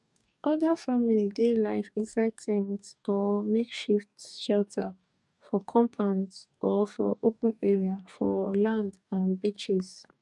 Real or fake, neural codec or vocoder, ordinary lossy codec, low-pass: fake; codec, 44.1 kHz, 2.6 kbps, DAC; none; 10.8 kHz